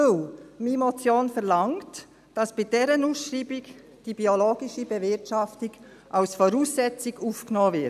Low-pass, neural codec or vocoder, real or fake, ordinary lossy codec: 14.4 kHz; none; real; none